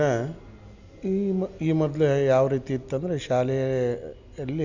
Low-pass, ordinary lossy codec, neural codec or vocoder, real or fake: 7.2 kHz; none; none; real